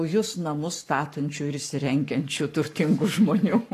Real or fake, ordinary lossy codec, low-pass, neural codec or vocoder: fake; AAC, 64 kbps; 14.4 kHz; codec, 44.1 kHz, 7.8 kbps, Pupu-Codec